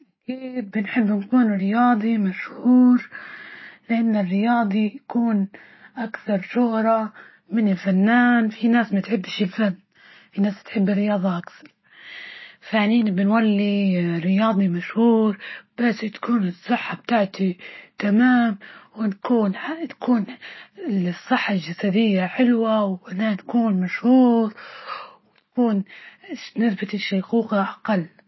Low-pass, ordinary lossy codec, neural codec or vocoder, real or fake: 7.2 kHz; MP3, 24 kbps; none; real